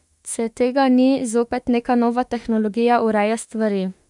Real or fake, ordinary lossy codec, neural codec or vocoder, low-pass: fake; none; autoencoder, 48 kHz, 32 numbers a frame, DAC-VAE, trained on Japanese speech; 10.8 kHz